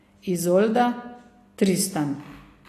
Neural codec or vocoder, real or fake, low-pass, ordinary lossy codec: none; real; 14.4 kHz; AAC, 48 kbps